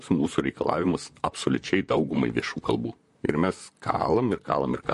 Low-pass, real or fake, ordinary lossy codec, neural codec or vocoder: 14.4 kHz; fake; MP3, 48 kbps; vocoder, 44.1 kHz, 128 mel bands, Pupu-Vocoder